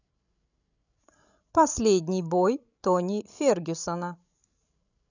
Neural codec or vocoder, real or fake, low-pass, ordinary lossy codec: codec, 16 kHz, 16 kbps, FreqCodec, larger model; fake; 7.2 kHz; none